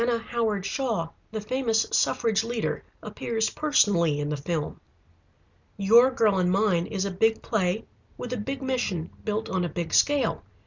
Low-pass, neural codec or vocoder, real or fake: 7.2 kHz; none; real